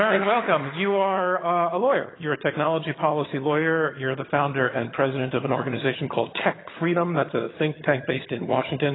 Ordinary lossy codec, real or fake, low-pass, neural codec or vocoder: AAC, 16 kbps; fake; 7.2 kHz; vocoder, 22.05 kHz, 80 mel bands, HiFi-GAN